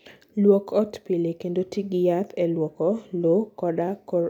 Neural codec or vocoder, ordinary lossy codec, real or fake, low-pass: none; none; real; 19.8 kHz